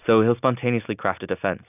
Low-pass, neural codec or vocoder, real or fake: 3.6 kHz; none; real